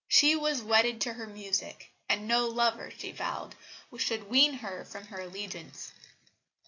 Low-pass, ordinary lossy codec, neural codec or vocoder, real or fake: 7.2 kHz; AAC, 48 kbps; none; real